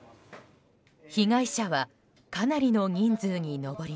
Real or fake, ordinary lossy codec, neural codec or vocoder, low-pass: real; none; none; none